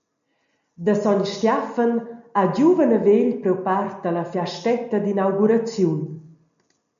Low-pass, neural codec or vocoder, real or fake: 7.2 kHz; none; real